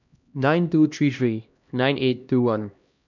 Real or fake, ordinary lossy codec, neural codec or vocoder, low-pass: fake; none; codec, 16 kHz, 1 kbps, X-Codec, HuBERT features, trained on LibriSpeech; 7.2 kHz